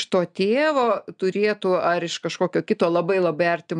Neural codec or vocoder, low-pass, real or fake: none; 9.9 kHz; real